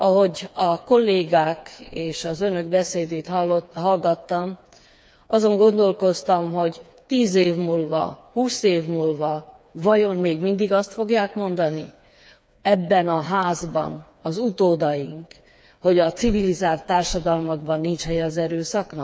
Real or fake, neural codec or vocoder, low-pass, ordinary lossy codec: fake; codec, 16 kHz, 4 kbps, FreqCodec, smaller model; none; none